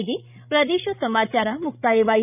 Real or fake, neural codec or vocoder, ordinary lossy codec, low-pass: fake; codec, 16 kHz, 16 kbps, FreqCodec, larger model; none; 3.6 kHz